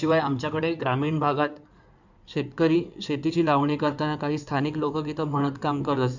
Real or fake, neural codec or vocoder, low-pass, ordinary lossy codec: fake; codec, 16 kHz in and 24 kHz out, 2.2 kbps, FireRedTTS-2 codec; 7.2 kHz; none